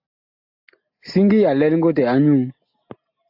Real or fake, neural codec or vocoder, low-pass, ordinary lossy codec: real; none; 5.4 kHz; AAC, 48 kbps